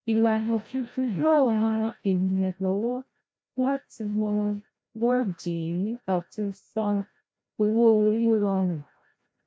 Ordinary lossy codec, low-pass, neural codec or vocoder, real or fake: none; none; codec, 16 kHz, 0.5 kbps, FreqCodec, larger model; fake